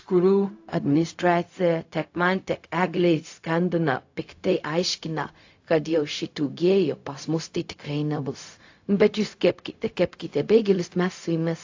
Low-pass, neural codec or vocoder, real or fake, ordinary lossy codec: 7.2 kHz; codec, 16 kHz, 0.4 kbps, LongCat-Audio-Codec; fake; AAC, 48 kbps